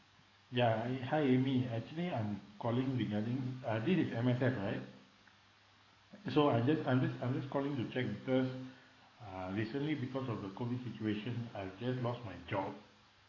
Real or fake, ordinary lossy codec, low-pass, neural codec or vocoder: fake; AAC, 32 kbps; 7.2 kHz; codec, 44.1 kHz, 7.8 kbps, DAC